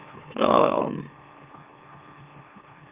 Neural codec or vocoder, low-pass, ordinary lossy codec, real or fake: autoencoder, 44.1 kHz, a latent of 192 numbers a frame, MeloTTS; 3.6 kHz; Opus, 32 kbps; fake